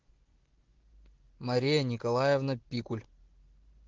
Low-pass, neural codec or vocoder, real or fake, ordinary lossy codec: 7.2 kHz; none; real; Opus, 16 kbps